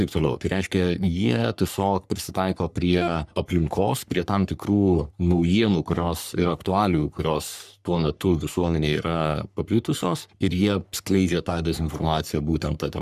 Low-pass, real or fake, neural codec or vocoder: 14.4 kHz; fake; codec, 44.1 kHz, 3.4 kbps, Pupu-Codec